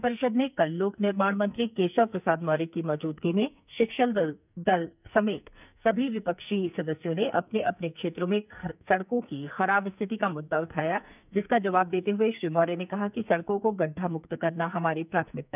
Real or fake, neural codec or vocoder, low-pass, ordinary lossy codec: fake; codec, 44.1 kHz, 2.6 kbps, SNAC; 3.6 kHz; none